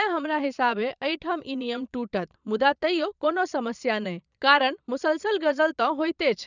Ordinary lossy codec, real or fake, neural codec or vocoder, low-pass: none; fake; vocoder, 22.05 kHz, 80 mel bands, Vocos; 7.2 kHz